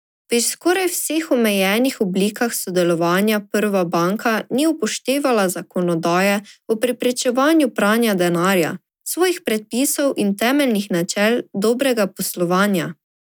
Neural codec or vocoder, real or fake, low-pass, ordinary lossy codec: none; real; none; none